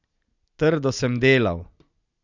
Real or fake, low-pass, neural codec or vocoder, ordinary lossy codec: real; 7.2 kHz; none; none